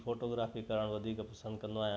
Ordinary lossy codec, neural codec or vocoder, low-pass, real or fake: none; none; none; real